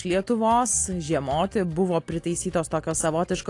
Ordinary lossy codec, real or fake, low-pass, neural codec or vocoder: AAC, 48 kbps; real; 10.8 kHz; none